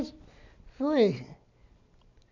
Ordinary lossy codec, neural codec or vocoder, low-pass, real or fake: none; none; 7.2 kHz; real